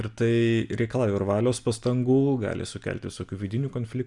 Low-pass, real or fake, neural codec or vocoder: 10.8 kHz; real; none